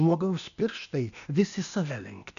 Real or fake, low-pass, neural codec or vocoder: fake; 7.2 kHz; codec, 16 kHz, 0.8 kbps, ZipCodec